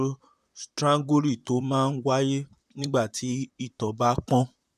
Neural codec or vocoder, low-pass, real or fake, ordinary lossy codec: vocoder, 44.1 kHz, 128 mel bands, Pupu-Vocoder; 14.4 kHz; fake; none